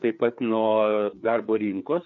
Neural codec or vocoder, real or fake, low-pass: codec, 16 kHz, 4 kbps, FreqCodec, larger model; fake; 7.2 kHz